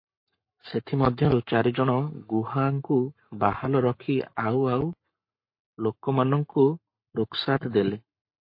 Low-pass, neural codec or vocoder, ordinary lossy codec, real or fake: 5.4 kHz; codec, 44.1 kHz, 7.8 kbps, Pupu-Codec; MP3, 32 kbps; fake